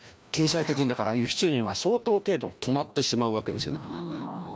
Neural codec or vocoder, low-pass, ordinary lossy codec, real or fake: codec, 16 kHz, 1 kbps, FreqCodec, larger model; none; none; fake